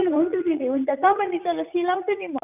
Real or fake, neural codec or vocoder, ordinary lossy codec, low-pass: fake; vocoder, 44.1 kHz, 80 mel bands, Vocos; none; 3.6 kHz